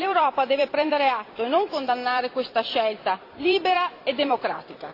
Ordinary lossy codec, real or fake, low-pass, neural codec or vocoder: AAC, 32 kbps; fake; 5.4 kHz; vocoder, 44.1 kHz, 128 mel bands every 256 samples, BigVGAN v2